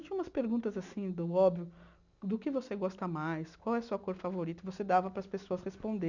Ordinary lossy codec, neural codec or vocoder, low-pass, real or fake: none; none; 7.2 kHz; real